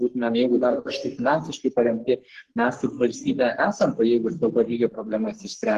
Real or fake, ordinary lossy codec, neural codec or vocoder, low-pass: fake; Opus, 16 kbps; codec, 44.1 kHz, 3.4 kbps, Pupu-Codec; 14.4 kHz